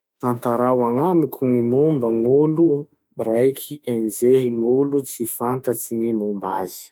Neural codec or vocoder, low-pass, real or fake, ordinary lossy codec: autoencoder, 48 kHz, 32 numbers a frame, DAC-VAE, trained on Japanese speech; 19.8 kHz; fake; none